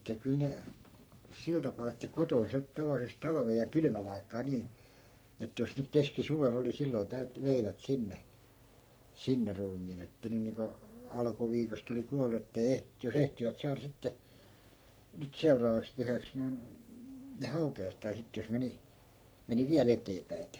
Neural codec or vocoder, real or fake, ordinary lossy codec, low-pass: codec, 44.1 kHz, 3.4 kbps, Pupu-Codec; fake; none; none